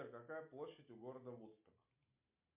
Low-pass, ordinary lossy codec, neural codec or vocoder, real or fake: 3.6 kHz; MP3, 32 kbps; none; real